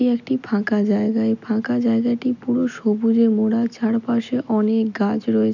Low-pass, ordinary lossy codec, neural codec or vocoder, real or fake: 7.2 kHz; none; none; real